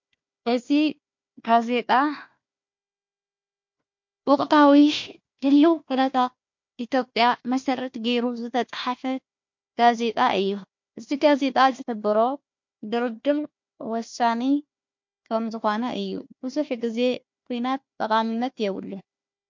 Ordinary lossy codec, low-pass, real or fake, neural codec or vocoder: MP3, 48 kbps; 7.2 kHz; fake; codec, 16 kHz, 1 kbps, FunCodec, trained on Chinese and English, 50 frames a second